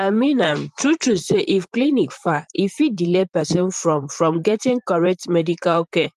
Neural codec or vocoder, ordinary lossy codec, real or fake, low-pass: vocoder, 44.1 kHz, 128 mel bands, Pupu-Vocoder; Opus, 32 kbps; fake; 14.4 kHz